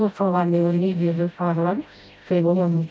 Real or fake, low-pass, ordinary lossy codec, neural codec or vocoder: fake; none; none; codec, 16 kHz, 0.5 kbps, FreqCodec, smaller model